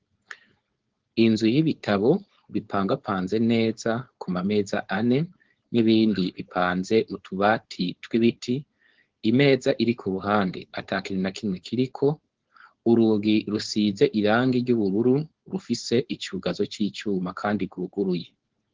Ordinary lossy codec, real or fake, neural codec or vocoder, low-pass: Opus, 16 kbps; fake; codec, 16 kHz, 4.8 kbps, FACodec; 7.2 kHz